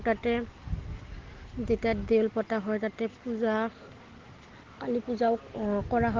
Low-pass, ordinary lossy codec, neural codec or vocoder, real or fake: 7.2 kHz; Opus, 16 kbps; none; real